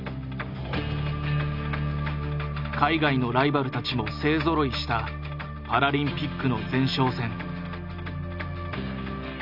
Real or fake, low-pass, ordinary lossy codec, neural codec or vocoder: real; 5.4 kHz; none; none